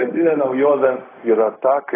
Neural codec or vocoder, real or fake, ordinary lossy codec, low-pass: codec, 16 kHz, 0.4 kbps, LongCat-Audio-Codec; fake; AAC, 16 kbps; 3.6 kHz